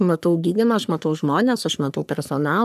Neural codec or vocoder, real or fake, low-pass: codec, 44.1 kHz, 3.4 kbps, Pupu-Codec; fake; 14.4 kHz